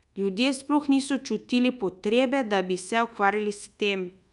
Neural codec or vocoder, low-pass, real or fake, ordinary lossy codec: codec, 24 kHz, 1.2 kbps, DualCodec; 10.8 kHz; fake; none